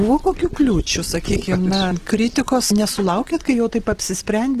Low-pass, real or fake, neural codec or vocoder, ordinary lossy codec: 14.4 kHz; real; none; Opus, 16 kbps